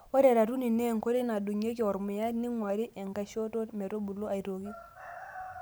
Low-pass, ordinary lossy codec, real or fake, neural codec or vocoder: none; none; real; none